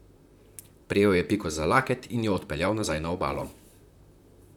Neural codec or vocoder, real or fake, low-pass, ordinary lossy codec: vocoder, 44.1 kHz, 128 mel bands, Pupu-Vocoder; fake; 19.8 kHz; none